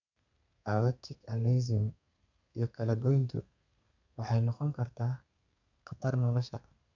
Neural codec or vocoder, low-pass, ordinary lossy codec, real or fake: codec, 32 kHz, 1.9 kbps, SNAC; 7.2 kHz; none; fake